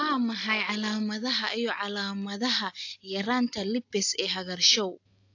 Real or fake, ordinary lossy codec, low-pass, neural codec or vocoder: real; AAC, 48 kbps; 7.2 kHz; none